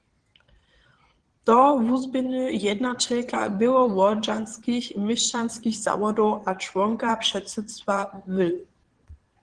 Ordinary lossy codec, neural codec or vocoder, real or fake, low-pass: Opus, 16 kbps; vocoder, 22.05 kHz, 80 mel bands, Vocos; fake; 9.9 kHz